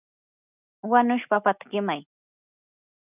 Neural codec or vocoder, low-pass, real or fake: none; 3.6 kHz; real